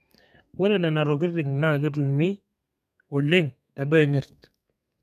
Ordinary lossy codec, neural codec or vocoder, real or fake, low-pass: none; codec, 32 kHz, 1.9 kbps, SNAC; fake; 14.4 kHz